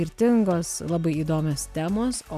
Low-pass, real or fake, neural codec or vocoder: 14.4 kHz; real; none